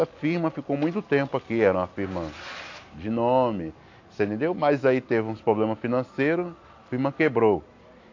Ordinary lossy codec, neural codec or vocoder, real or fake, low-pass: AAC, 48 kbps; none; real; 7.2 kHz